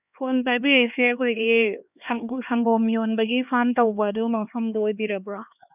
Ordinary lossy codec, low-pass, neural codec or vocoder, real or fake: none; 3.6 kHz; codec, 16 kHz, 2 kbps, X-Codec, HuBERT features, trained on LibriSpeech; fake